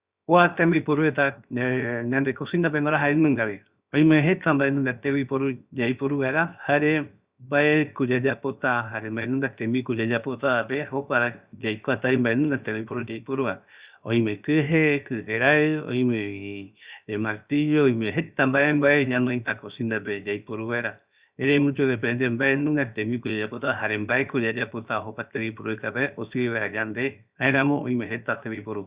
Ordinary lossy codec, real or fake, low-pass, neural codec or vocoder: Opus, 64 kbps; fake; 3.6 kHz; codec, 16 kHz, 0.7 kbps, FocalCodec